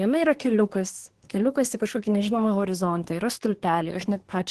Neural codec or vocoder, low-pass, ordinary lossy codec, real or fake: codec, 24 kHz, 1 kbps, SNAC; 10.8 kHz; Opus, 16 kbps; fake